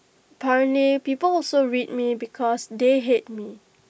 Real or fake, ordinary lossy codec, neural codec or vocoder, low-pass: real; none; none; none